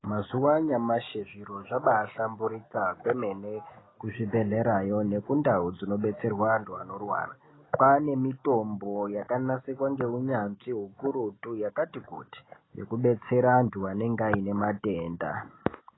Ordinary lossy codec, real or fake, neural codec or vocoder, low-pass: AAC, 16 kbps; real; none; 7.2 kHz